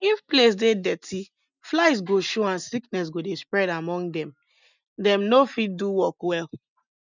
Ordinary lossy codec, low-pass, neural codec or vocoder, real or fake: none; 7.2 kHz; none; real